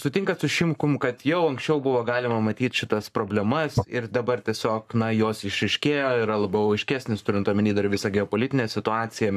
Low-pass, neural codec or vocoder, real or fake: 14.4 kHz; codec, 44.1 kHz, 7.8 kbps, Pupu-Codec; fake